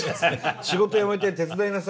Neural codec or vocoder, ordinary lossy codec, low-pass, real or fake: none; none; none; real